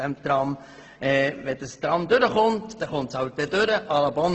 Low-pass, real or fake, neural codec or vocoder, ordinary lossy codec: 7.2 kHz; real; none; Opus, 16 kbps